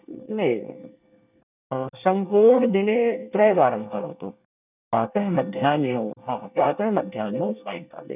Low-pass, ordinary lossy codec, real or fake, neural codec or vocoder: 3.6 kHz; none; fake; codec, 24 kHz, 1 kbps, SNAC